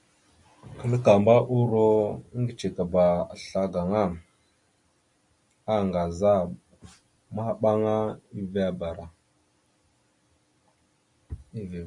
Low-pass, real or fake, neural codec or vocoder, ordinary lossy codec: 10.8 kHz; real; none; MP3, 64 kbps